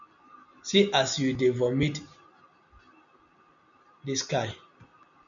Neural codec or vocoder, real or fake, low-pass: none; real; 7.2 kHz